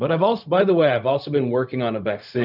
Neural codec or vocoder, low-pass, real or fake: codec, 16 kHz, 0.4 kbps, LongCat-Audio-Codec; 5.4 kHz; fake